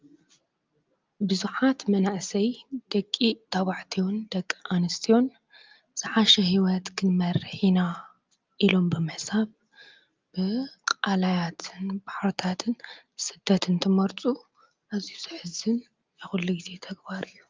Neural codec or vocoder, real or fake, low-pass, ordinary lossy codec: none; real; 7.2 kHz; Opus, 24 kbps